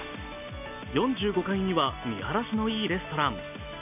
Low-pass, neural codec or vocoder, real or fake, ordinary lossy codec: 3.6 kHz; none; real; none